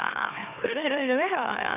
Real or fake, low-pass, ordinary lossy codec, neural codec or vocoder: fake; 3.6 kHz; none; autoencoder, 44.1 kHz, a latent of 192 numbers a frame, MeloTTS